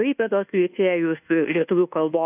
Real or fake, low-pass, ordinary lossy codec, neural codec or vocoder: fake; 3.6 kHz; AAC, 32 kbps; codec, 24 kHz, 1.2 kbps, DualCodec